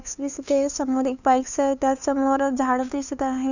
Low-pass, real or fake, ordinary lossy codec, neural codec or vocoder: 7.2 kHz; fake; none; codec, 16 kHz, 2 kbps, FunCodec, trained on LibriTTS, 25 frames a second